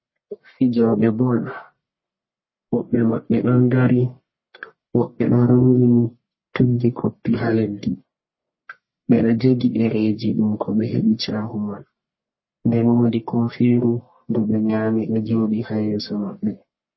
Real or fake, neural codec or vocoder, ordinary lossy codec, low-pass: fake; codec, 44.1 kHz, 1.7 kbps, Pupu-Codec; MP3, 24 kbps; 7.2 kHz